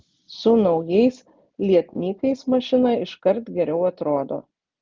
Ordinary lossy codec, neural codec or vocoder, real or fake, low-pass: Opus, 32 kbps; none; real; 7.2 kHz